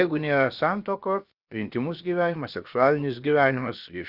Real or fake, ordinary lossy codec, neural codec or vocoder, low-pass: fake; Opus, 64 kbps; codec, 16 kHz, about 1 kbps, DyCAST, with the encoder's durations; 5.4 kHz